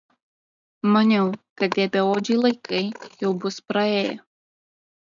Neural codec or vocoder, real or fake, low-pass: none; real; 7.2 kHz